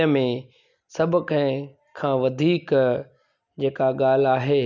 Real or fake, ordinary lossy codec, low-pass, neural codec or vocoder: real; none; 7.2 kHz; none